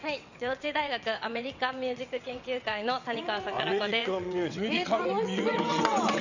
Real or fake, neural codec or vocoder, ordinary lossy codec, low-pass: fake; vocoder, 22.05 kHz, 80 mel bands, WaveNeXt; none; 7.2 kHz